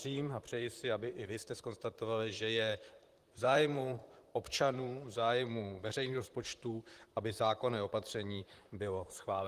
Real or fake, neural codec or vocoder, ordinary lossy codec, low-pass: fake; vocoder, 44.1 kHz, 128 mel bands, Pupu-Vocoder; Opus, 24 kbps; 14.4 kHz